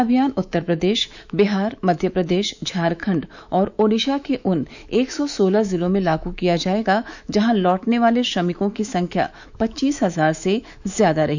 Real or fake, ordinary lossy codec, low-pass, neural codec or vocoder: fake; none; 7.2 kHz; autoencoder, 48 kHz, 128 numbers a frame, DAC-VAE, trained on Japanese speech